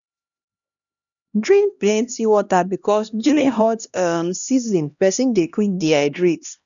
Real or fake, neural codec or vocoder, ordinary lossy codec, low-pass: fake; codec, 16 kHz, 1 kbps, X-Codec, HuBERT features, trained on LibriSpeech; none; 7.2 kHz